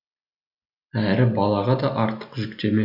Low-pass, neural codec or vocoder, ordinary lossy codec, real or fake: 5.4 kHz; none; none; real